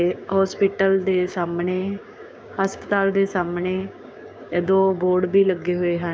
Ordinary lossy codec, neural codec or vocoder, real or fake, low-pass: none; codec, 16 kHz, 6 kbps, DAC; fake; none